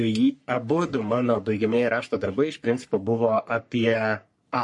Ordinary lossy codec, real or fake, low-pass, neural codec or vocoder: MP3, 48 kbps; fake; 10.8 kHz; codec, 44.1 kHz, 3.4 kbps, Pupu-Codec